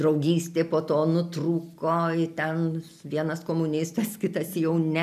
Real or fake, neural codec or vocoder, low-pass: real; none; 14.4 kHz